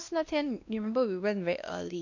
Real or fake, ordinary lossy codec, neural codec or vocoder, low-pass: fake; none; codec, 16 kHz, 1 kbps, X-Codec, WavLM features, trained on Multilingual LibriSpeech; 7.2 kHz